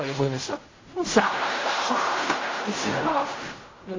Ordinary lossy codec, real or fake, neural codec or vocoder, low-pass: MP3, 32 kbps; fake; codec, 16 kHz in and 24 kHz out, 0.4 kbps, LongCat-Audio-Codec, fine tuned four codebook decoder; 7.2 kHz